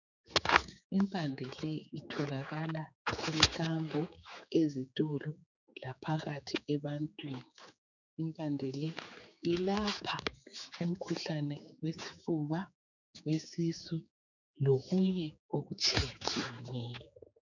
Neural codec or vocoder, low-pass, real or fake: codec, 16 kHz, 4 kbps, X-Codec, HuBERT features, trained on general audio; 7.2 kHz; fake